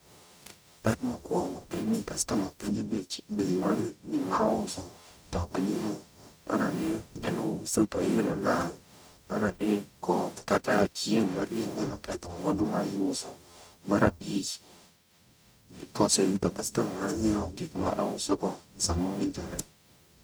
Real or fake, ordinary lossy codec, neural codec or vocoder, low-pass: fake; none; codec, 44.1 kHz, 0.9 kbps, DAC; none